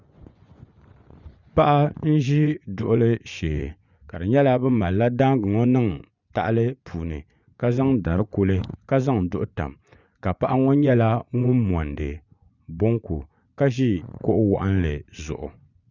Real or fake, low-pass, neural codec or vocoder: fake; 7.2 kHz; vocoder, 24 kHz, 100 mel bands, Vocos